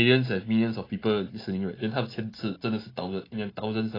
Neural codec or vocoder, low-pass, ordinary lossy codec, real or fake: none; 5.4 kHz; AAC, 24 kbps; real